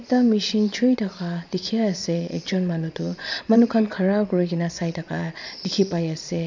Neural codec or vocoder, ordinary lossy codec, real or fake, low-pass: vocoder, 22.05 kHz, 80 mel bands, Vocos; MP3, 64 kbps; fake; 7.2 kHz